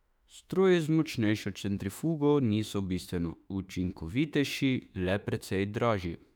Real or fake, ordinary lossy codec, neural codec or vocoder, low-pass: fake; none; autoencoder, 48 kHz, 32 numbers a frame, DAC-VAE, trained on Japanese speech; 19.8 kHz